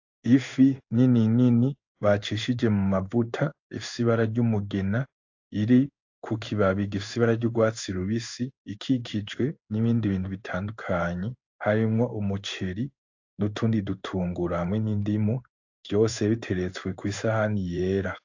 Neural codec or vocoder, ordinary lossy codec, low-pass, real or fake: codec, 16 kHz in and 24 kHz out, 1 kbps, XY-Tokenizer; AAC, 48 kbps; 7.2 kHz; fake